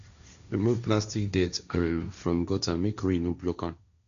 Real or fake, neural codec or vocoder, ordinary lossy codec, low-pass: fake; codec, 16 kHz, 1.1 kbps, Voila-Tokenizer; none; 7.2 kHz